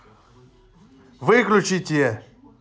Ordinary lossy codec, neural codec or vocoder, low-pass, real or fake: none; none; none; real